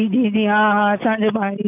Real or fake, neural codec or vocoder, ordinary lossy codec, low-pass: real; none; none; 3.6 kHz